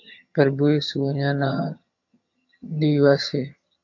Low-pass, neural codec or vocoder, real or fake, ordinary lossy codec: 7.2 kHz; vocoder, 22.05 kHz, 80 mel bands, HiFi-GAN; fake; AAC, 48 kbps